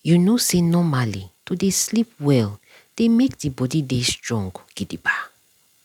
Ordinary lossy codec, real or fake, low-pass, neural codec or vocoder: none; real; 19.8 kHz; none